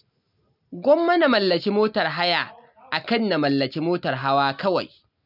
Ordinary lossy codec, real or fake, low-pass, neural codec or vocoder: none; real; 5.4 kHz; none